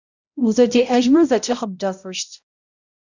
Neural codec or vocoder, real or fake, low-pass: codec, 16 kHz, 0.5 kbps, X-Codec, HuBERT features, trained on balanced general audio; fake; 7.2 kHz